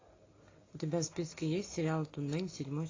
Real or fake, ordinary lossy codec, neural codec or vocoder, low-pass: real; AAC, 32 kbps; none; 7.2 kHz